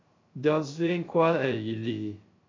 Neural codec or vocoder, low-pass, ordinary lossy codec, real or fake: codec, 16 kHz, 0.3 kbps, FocalCodec; 7.2 kHz; AAC, 32 kbps; fake